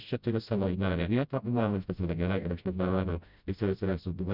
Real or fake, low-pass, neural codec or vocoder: fake; 5.4 kHz; codec, 16 kHz, 0.5 kbps, FreqCodec, smaller model